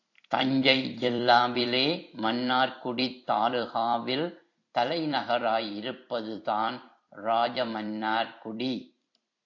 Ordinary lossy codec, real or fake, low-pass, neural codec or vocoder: AAC, 48 kbps; fake; 7.2 kHz; vocoder, 44.1 kHz, 128 mel bands every 256 samples, BigVGAN v2